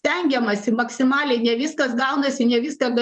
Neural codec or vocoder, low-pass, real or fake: vocoder, 24 kHz, 100 mel bands, Vocos; 10.8 kHz; fake